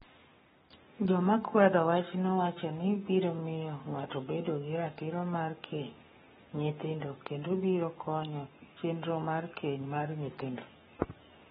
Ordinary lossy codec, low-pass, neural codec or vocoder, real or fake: AAC, 16 kbps; 19.8 kHz; codec, 44.1 kHz, 7.8 kbps, Pupu-Codec; fake